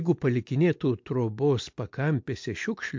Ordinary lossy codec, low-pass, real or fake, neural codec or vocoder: MP3, 48 kbps; 7.2 kHz; real; none